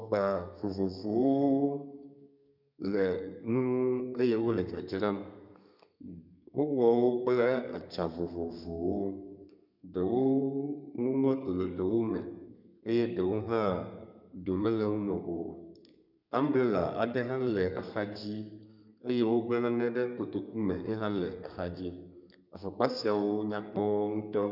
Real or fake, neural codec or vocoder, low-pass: fake; codec, 32 kHz, 1.9 kbps, SNAC; 5.4 kHz